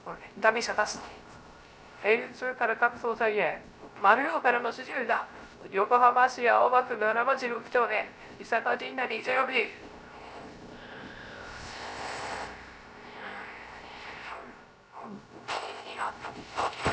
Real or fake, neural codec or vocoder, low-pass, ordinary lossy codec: fake; codec, 16 kHz, 0.3 kbps, FocalCodec; none; none